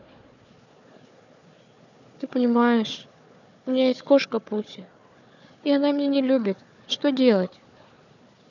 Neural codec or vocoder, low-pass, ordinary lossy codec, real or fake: codec, 16 kHz, 4 kbps, FunCodec, trained on Chinese and English, 50 frames a second; 7.2 kHz; none; fake